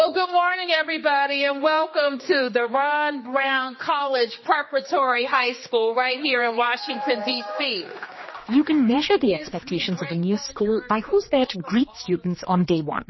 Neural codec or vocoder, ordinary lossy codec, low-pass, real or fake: codec, 16 kHz, 2 kbps, X-Codec, HuBERT features, trained on general audio; MP3, 24 kbps; 7.2 kHz; fake